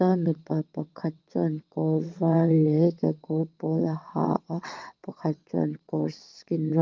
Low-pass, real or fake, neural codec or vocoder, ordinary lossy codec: 7.2 kHz; fake; vocoder, 22.05 kHz, 80 mel bands, WaveNeXt; Opus, 24 kbps